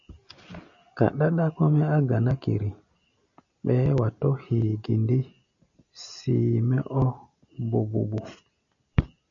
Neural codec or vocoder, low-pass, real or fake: none; 7.2 kHz; real